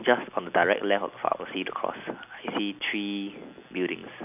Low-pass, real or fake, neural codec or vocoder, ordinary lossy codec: 3.6 kHz; real; none; none